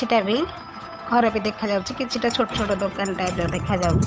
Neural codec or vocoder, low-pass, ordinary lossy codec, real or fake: codec, 16 kHz, 8 kbps, FunCodec, trained on Chinese and English, 25 frames a second; none; none; fake